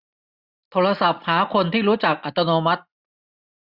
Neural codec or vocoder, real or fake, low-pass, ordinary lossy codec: none; real; 5.4 kHz; Opus, 64 kbps